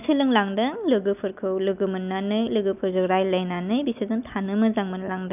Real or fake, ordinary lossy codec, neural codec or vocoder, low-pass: real; none; none; 3.6 kHz